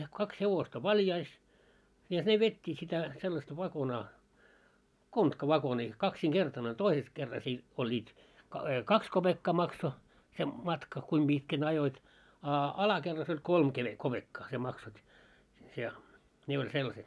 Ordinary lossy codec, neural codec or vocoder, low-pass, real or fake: none; none; none; real